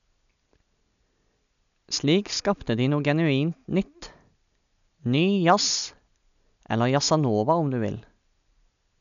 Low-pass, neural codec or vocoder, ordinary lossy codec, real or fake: 7.2 kHz; none; none; real